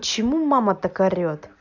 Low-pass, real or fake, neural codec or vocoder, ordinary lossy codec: 7.2 kHz; real; none; none